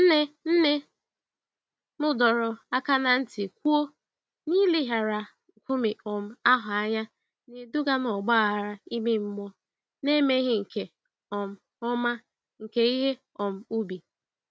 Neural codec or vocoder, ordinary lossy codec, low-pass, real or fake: none; none; none; real